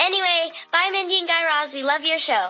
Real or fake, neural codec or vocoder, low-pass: real; none; 7.2 kHz